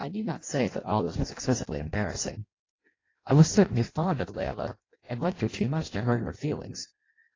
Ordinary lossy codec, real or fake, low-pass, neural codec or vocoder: AAC, 32 kbps; fake; 7.2 kHz; codec, 16 kHz in and 24 kHz out, 0.6 kbps, FireRedTTS-2 codec